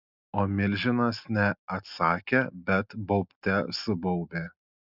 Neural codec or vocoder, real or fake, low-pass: none; real; 5.4 kHz